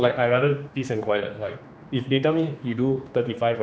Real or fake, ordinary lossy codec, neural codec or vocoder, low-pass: fake; none; codec, 16 kHz, 2 kbps, X-Codec, HuBERT features, trained on general audio; none